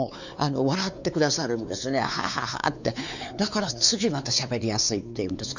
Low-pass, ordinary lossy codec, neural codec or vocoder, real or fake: 7.2 kHz; none; codec, 16 kHz, 4 kbps, X-Codec, WavLM features, trained on Multilingual LibriSpeech; fake